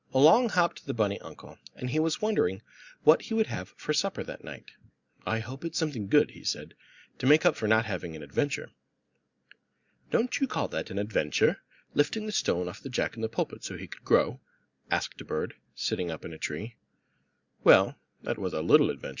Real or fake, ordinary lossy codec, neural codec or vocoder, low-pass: real; Opus, 64 kbps; none; 7.2 kHz